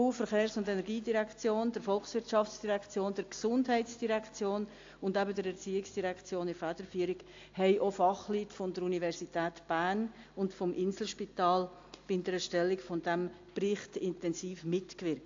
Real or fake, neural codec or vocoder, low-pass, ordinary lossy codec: real; none; 7.2 kHz; AAC, 48 kbps